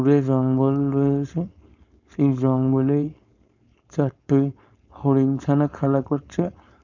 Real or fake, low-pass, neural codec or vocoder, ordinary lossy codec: fake; 7.2 kHz; codec, 16 kHz, 4.8 kbps, FACodec; none